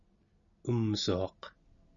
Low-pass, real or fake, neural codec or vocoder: 7.2 kHz; real; none